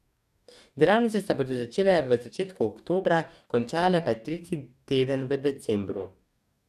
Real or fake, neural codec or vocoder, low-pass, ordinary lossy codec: fake; codec, 44.1 kHz, 2.6 kbps, DAC; 14.4 kHz; none